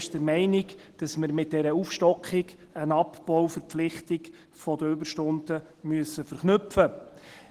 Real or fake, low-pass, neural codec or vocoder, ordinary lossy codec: real; 14.4 kHz; none; Opus, 16 kbps